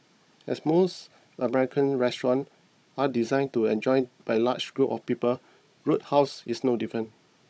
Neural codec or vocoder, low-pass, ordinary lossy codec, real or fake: codec, 16 kHz, 16 kbps, FunCodec, trained on Chinese and English, 50 frames a second; none; none; fake